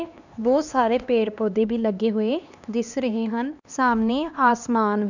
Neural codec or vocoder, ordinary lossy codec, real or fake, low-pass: codec, 16 kHz, 2 kbps, X-Codec, HuBERT features, trained on LibriSpeech; none; fake; 7.2 kHz